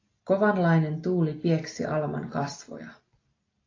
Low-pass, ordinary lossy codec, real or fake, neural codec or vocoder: 7.2 kHz; AAC, 32 kbps; real; none